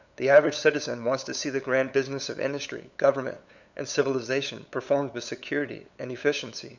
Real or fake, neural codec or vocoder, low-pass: fake; codec, 16 kHz, 8 kbps, FunCodec, trained on LibriTTS, 25 frames a second; 7.2 kHz